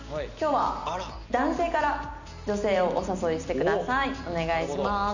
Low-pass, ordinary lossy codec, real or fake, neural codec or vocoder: 7.2 kHz; none; real; none